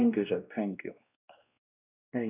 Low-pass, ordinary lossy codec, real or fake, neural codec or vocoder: 3.6 kHz; none; fake; codec, 16 kHz, 1 kbps, X-Codec, WavLM features, trained on Multilingual LibriSpeech